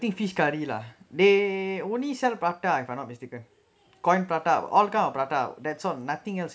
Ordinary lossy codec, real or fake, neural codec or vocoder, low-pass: none; real; none; none